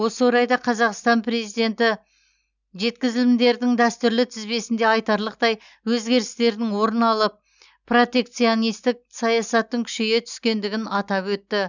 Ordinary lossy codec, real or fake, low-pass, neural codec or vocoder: none; real; 7.2 kHz; none